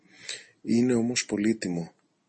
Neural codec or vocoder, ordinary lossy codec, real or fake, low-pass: none; MP3, 32 kbps; real; 10.8 kHz